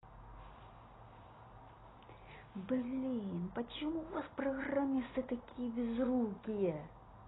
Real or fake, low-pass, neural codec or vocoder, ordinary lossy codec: real; 7.2 kHz; none; AAC, 16 kbps